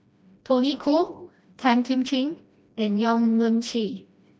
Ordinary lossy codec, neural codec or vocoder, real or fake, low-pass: none; codec, 16 kHz, 1 kbps, FreqCodec, smaller model; fake; none